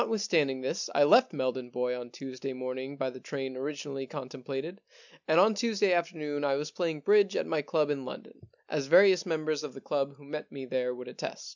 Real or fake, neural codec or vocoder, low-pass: real; none; 7.2 kHz